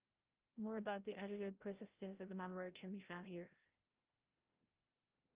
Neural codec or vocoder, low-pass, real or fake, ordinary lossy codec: codec, 16 kHz, 0.5 kbps, FreqCodec, larger model; 3.6 kHz; fake; Opus, 24 kbps